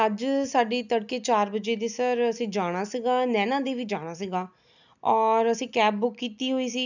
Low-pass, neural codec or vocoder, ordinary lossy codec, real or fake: 7.2 kHz; none; none; real